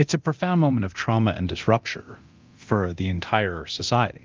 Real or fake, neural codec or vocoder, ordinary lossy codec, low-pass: fake; codec, 24 kHz, 0.9 kbps, DualCodec; Opus, 24 kbps; 7.2 kHz